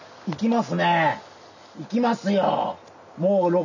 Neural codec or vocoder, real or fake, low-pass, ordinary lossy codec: none; real; 7.2 kHz; none